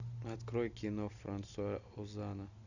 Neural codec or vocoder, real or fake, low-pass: none; real; 7.2 kHz